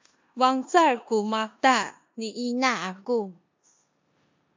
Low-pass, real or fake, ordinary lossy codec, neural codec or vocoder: 7.2 kHz; fake; MP3, 48 kbps; codec, 16 kHz in and 24 kHz out, 0.9 kbps, LongCat-Audio-Codec, four codebook decoder